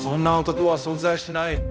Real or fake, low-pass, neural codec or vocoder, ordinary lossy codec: fake; none; codec, 16 kHz, 0.5 kbps, X-Codec, HuBERT features, trained on balanced general audio; none